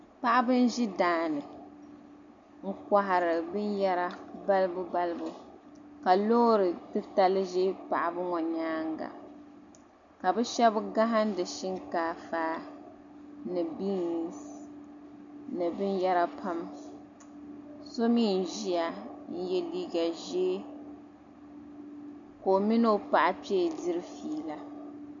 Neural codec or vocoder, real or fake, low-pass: none; real; 7.2 kHz